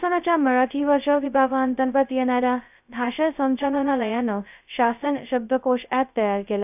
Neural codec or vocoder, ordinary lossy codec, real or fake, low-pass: codec, 16 kHz, 0.2 kbps, FocalCodec; none; fake; 3.6 kHz